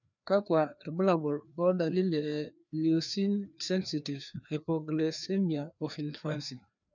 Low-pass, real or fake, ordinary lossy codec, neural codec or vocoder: 7.2 kHz; fake; none; codec, 16 kHz, 2 kbps, FreqCodec, larger model